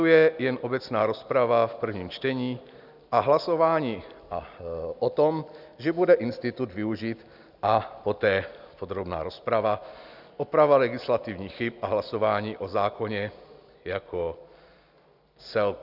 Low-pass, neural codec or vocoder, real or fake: 5.4 kHz; none; real